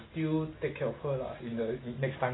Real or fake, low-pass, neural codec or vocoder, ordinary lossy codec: real; 7.2 kHz; none; AAC, 16 kbps